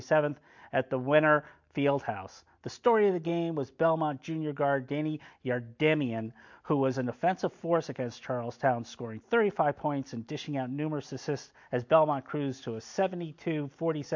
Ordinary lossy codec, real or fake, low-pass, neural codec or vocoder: MP3, 48 kbps; real; 7.2 kHz; none